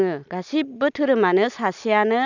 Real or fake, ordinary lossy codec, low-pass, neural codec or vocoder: real; none; 7.2 kHz; none